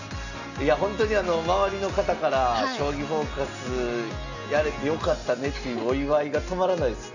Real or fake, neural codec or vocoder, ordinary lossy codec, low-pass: real; none; none; 7.2 kHz